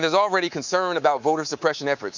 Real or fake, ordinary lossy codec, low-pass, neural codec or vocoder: fake; Opus, 64 kbps; 7.2 kHz; codec, 24 kHz, 3.1 kbps, DualCodec